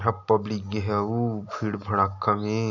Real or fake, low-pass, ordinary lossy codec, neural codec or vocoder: real; 7.2 kHz; none; none